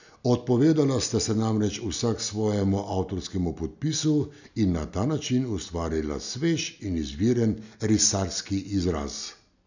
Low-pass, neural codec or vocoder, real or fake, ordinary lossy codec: 7.2 kHz; none; real; none